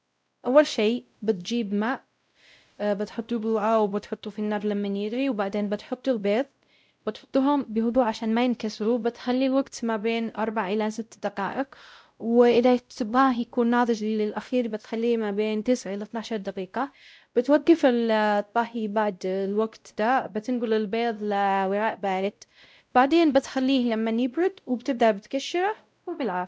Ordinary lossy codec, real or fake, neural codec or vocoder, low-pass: none; fake; codec, 16 kHz, 0.5 kbps, X-Codec, WavLM features, trained on Multilingual LibriSpeech; none